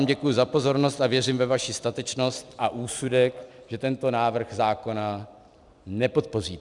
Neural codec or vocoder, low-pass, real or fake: vocoder, 44.1 kHz, 128 mel bands every 256 samples, BigVGAN v2; 10.8 kHz; fake